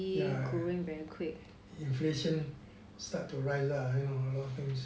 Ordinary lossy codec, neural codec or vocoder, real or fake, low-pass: none; none; real; none